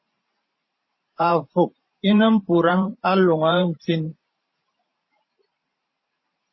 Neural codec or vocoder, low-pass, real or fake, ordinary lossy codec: vocoder, 44.1 kHz, 128 mel bands every 512 samples, BigVGAN v2; 7.2 kHz; fake; MP3, 24 kbps